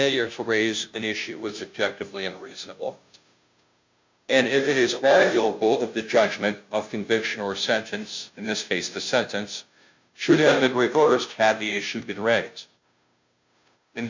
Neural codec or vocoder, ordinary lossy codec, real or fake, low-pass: codec, 16 kHz, 0.5 kbps, FunCodec, trained on Chinese and English, 25 frames a second; MP3, 64 kbps; fake; 7.2 kHz